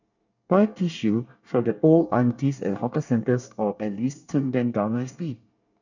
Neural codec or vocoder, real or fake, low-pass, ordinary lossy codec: codec, 24 kHz, 1 kbps, SNAC; fake; 7.2 kHz; none